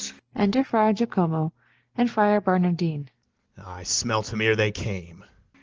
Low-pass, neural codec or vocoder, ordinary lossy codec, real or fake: 7.2 kHz; none; Opus, 16 kbps; real